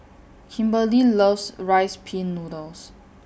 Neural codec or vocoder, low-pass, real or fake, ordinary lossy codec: none; none; real; none